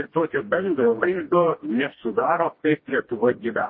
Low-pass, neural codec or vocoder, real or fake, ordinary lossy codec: 7.2 kHz; codec, 16 kHz, 1 kbps, FreqCodec, smaller model; fake; MP3, 24 kbps